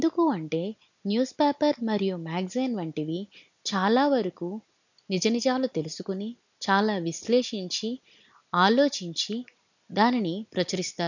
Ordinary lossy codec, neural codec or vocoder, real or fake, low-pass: none; none; real; 7.2 kHz